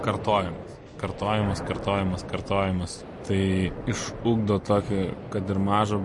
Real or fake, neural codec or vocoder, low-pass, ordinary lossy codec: fake; vocoder, 44.1 kHz, 128 mel bands every 256 samples, BigVGAN v2; 10.8 kHz; MP3, 48 kbps